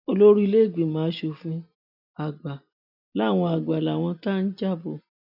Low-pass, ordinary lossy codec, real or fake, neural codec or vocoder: 5.4 kHz; none; real; none